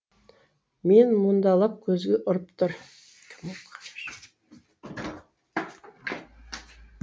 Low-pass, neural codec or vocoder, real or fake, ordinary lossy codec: none; none; real; none